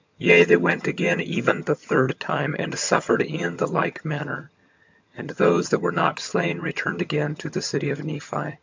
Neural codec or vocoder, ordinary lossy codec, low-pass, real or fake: vocoder, 22.05 kHz, 80 mel bands, HiFi-GAN; MP3, 64 kbps; 7.2 kHz; fake